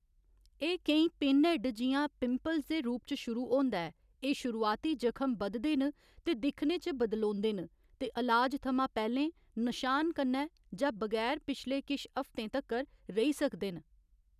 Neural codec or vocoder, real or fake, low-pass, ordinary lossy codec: none; real; 14.4 kHz; none